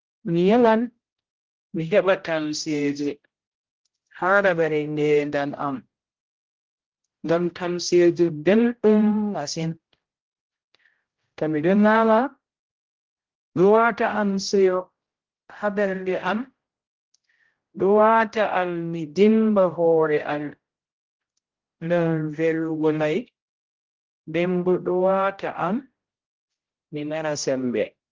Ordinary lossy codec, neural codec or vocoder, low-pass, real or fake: Opus, 16 kbps; codec, 16 kHz, 0.5 kbps, X-Codec, HuBERT features, trained on general audio; 7.2 kHz; fake